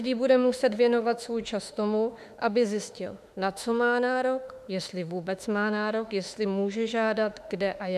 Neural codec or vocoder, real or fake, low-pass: autoencoder, 48 kHz, 32 numbers a frame, DAC-VAE, trained on Japanese speech; fake; 14.4 kHz